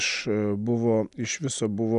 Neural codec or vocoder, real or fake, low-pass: none; real; 10.8 kHz